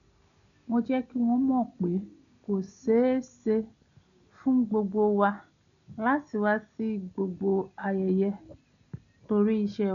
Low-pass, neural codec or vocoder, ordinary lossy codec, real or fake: 7.2 kHz; none; none; real